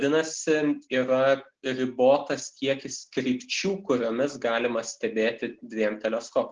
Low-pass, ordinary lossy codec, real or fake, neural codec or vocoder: 7.2 kHz; Opus, 16 kbps; real; none